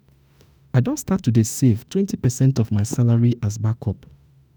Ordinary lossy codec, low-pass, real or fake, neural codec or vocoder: none; none; fake; autoencoder, 48 kHz, 32 numbers a frame, DAC-VAE, trained on Japanese speech